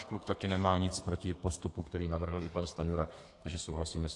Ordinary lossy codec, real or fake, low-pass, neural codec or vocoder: AAC, 48 kbps; fake; 10.8 kHz; codec, 32 kHz, 1.9 kbps, SNAC